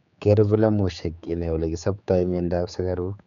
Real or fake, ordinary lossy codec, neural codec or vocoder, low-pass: fake; none; codec, 16 kHz, 4 kbps, X-Codec, HuBERT features, trained on general audio; 7.2 kHz